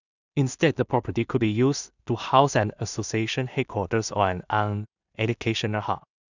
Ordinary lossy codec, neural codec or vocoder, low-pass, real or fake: none; codec, 16 kHz in and 24 kHz out, 0.4 kbps, LongCat-Audio-Codec, two codebook decoder; 7.2 kHz; fake